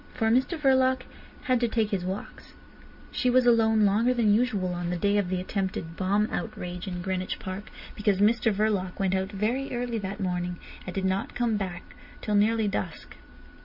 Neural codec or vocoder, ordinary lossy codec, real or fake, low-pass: none; MP3, 48 kbps; real; 5.4 kHz